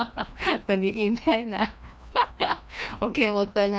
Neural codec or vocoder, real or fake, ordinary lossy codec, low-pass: codec, 16 kHz, 1 kbps, FreqCodec, larger model; fake; none; none